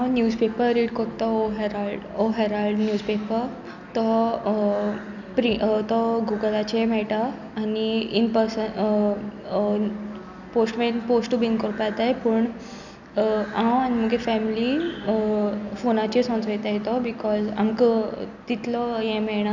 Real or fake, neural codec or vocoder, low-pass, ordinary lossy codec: real; none; 7.2 kHz; none